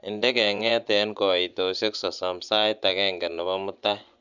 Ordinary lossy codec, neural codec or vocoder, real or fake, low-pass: none; vocoder, 24 kHz, 100 mel bands, Vocos; fake; 7.2 kHz